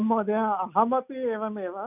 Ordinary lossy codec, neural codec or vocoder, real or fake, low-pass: none; none; real; 3.6 kHz